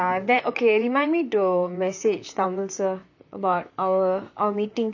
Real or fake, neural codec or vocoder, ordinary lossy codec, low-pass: fake; vocoder, 44.1 kHz, 128 mel bands, Pupu-Vocoder; none; 7.2 kHz